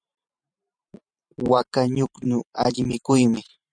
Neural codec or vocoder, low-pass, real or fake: vocoder, 44.1 kHz, 128 mel bands every 512 samples, BigVGAN v2; 9.9 kHz; fake